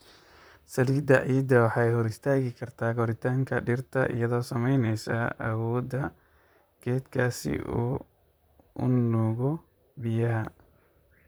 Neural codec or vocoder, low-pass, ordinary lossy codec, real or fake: vocoder, 44.1 kHz, 128 mel bands, Pupu-Vocoder; none; none; fake